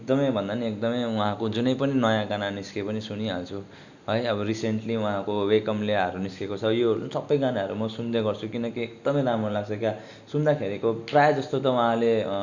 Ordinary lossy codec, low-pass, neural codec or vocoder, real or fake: none; 7.2 kHz; none; real